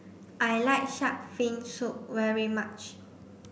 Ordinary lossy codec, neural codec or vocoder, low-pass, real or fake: none; none; none; real